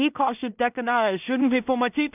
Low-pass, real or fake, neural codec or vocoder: 3.6 kHz; fake; codec, 16 kHz in and 24 kHz out, 0.4 kbps, LongCat-Audio-Codec, two codebook decoder